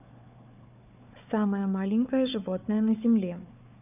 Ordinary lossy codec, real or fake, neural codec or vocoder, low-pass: none; fake; codec, 16 kHz, 4 kbps, FunCodec, trained on Chinese and English, 50 frames a second; 3.6 kHz